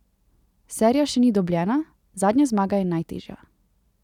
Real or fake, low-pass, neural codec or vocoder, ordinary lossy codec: real; 19.8 kHz; none; none